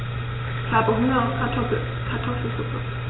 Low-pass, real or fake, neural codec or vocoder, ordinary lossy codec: 7.2 kHz; real; none; AAC, 16 kbps